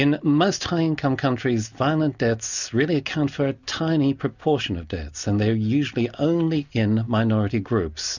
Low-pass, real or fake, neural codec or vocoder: 7.2 kHz; real; none